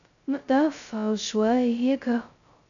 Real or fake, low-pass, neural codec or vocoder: fake; 7.2 kHz; codec, 16 kHz, 0.2 kbps, FocalCodec